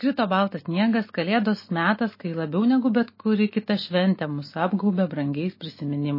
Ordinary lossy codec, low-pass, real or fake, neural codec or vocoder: MP3, 24 kbps; 5.4 kHz; real; none